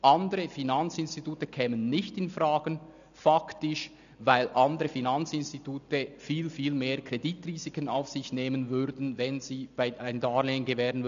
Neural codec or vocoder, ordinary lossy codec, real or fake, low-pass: none; none; real; 7.2 kHz